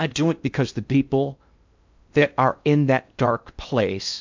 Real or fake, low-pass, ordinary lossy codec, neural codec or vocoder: fake; 7.2 kHz; MP3, 64 kbps; codec, 16 kHz in and 24 kHz out, 0.6 kbps, FocalCodec, streaming, 2048 codes